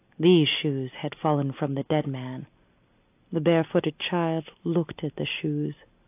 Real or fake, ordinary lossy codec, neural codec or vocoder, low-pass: real; AAC, 32 kbps; none; 3.6 kHz